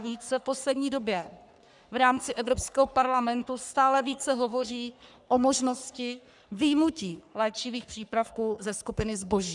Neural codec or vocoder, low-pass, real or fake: codec, 44.1 kHz, 3.4 kbps, Pupu-Codec; 10.8 kHz; fake